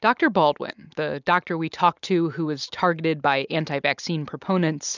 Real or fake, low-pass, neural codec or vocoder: real; 7.2 kHz; none